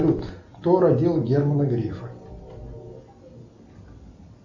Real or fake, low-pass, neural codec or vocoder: real; 7.2 kHz; none